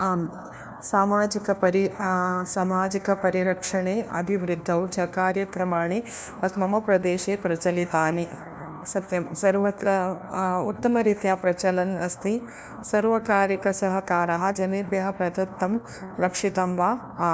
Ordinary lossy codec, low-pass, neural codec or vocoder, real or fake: none; none; codec, 16 kHz, 1 kbps, FunCodec, trained on LibriTTS, 50 frames a second; fake